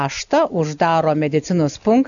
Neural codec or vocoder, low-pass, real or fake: none; 7.2 kHz; real